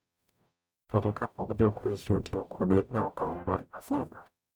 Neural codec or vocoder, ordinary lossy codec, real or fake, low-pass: codec, 44.1 kHz, 0.9 kbps, DAC; none; fake; none